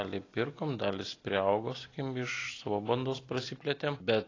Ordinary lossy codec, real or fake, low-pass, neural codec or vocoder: AAC, 32 kbps; real; 7.2 kHz; none